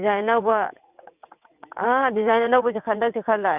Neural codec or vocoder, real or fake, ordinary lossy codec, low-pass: vocoder, 22.05 kHz, 80 mel bands, WaveNeXt; fake; none; 3.6 kHz